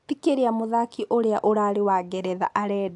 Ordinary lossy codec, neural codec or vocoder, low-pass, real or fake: AAC, 64 kbps; none; 10.8 kHz; real